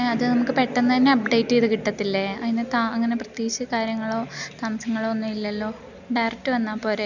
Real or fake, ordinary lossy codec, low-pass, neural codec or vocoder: real; none; 7.2 kHz; none